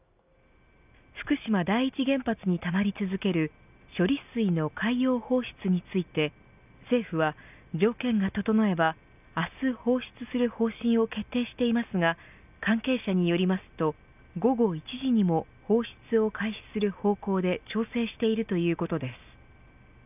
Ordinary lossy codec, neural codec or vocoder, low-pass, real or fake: none; none; 3.6 kHz; real